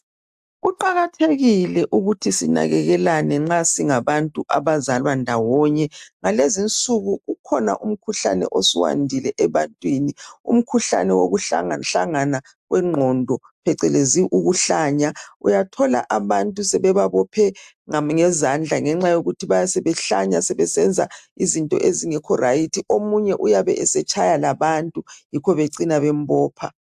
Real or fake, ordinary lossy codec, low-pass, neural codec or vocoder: real; AAC, 96 kbps; 14.4 kHz; none